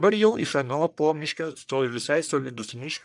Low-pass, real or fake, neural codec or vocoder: 10.8 kHz; fake; codec, 44.1 kHz, 1.7 kbps, Pupu-Codec